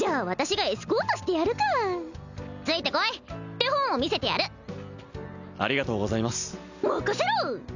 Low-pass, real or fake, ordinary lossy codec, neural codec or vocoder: 7.2 kHz; real; none; none